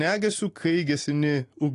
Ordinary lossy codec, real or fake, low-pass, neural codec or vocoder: AAC, 48 kbps; real; 10.8 kHz; none